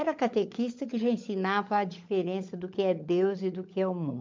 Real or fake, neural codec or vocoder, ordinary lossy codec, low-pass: fake; codec, 16 kHz, 16 kbps, FunCodec, trained on LibriTTS, 50 frames a second; MP3, 64 kbps; 7.2 kHz